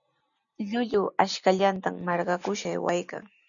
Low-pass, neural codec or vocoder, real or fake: 7.2 kHz; none; real